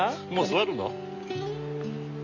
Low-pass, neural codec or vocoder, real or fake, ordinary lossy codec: 7.2 kHz; none; real; none